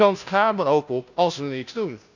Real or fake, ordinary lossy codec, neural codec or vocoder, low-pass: fake; none; codec, 16 kHz, 0.5 kbps, FunCodec, trained on Chinese and English, 25 frames a second; 7.2 kHz